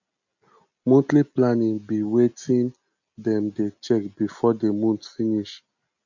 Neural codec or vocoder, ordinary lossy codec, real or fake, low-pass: none; none; real; 7.2 kHz